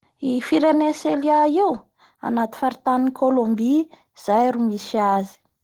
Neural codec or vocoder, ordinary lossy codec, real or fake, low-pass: none; Opus, 16 kbps; real; 19.8 kHz